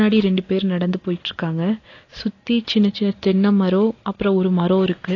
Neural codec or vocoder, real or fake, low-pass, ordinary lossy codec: none; real; 7.2 kHz; AAC, 32 kbps